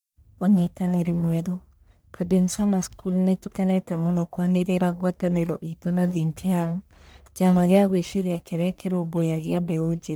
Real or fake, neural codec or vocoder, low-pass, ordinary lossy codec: fake; codec, 44.1 kHz, 1.7 kbps, Pupu-Codec; none; none